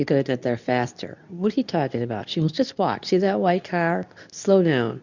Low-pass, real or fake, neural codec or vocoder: 7.2 kHz; fake; codec, 24 kHz, 0.9 kbps, WavTokenizer, medium speech release version 2